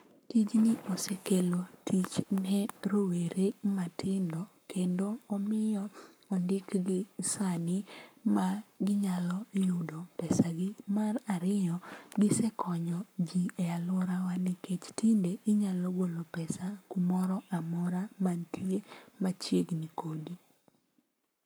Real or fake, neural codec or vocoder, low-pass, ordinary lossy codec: fake; codec, 44.1 kHz, 7.8 kbps, Pupu-Codec; none; none